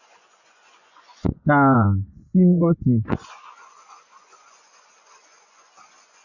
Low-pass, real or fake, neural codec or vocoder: 7.2 kHz; fake; vocoder, 24 kHz, 100 mel bands, Vocos